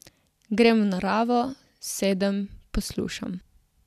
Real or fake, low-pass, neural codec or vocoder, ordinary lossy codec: real; 14.4 kHz; none; none